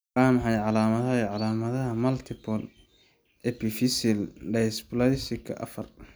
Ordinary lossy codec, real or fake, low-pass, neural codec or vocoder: none; real; none; none